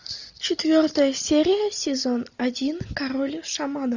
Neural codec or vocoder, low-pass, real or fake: none; 7.2 kHz; real